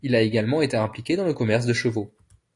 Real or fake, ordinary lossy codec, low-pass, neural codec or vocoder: real; AAC, 48 kbps; 10.8 kHz; none